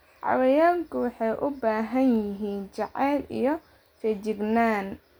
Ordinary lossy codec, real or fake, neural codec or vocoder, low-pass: none; real; none; none